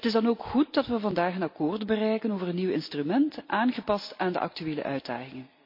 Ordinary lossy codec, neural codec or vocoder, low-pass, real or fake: MP3, 32 kbps; none; 5.4 kHz; real